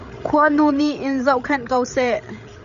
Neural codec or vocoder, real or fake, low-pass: codec, 16 kHz, 8 kbps, FreqCodec, larger model; fake; 7.2 kHz